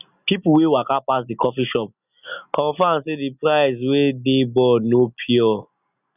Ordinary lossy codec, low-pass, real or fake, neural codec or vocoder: none; 3.6 kHz; real; none